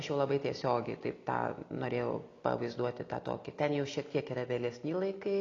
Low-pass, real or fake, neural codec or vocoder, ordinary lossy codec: 7.2 kHz; real; none; AAC, 32 kbps